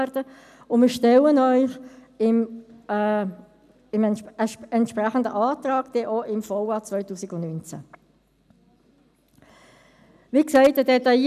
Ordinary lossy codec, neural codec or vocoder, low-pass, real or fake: none; none; 14.4 kHz; real